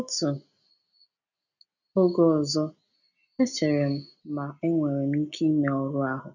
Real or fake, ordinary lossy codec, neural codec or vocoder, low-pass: real; none; none; 7.2 kHz